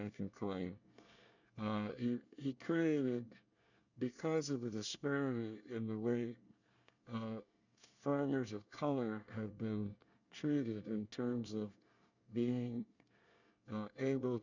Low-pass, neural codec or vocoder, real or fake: 7.2 kHz; codec, 24 kHz, 1 kbps, SNAC; fake